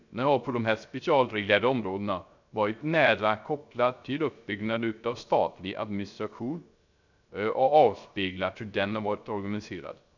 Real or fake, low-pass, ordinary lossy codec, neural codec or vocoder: fake; 7.2 kHz; none; codec, 16 kHz, 0.3 kbps, FocalCodec